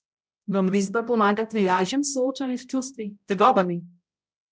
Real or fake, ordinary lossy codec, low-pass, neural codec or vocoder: fake; none; none; codec, 16 kHz, 0.5 kbps, X-Codec, HuBERT features, trained on balanced general audio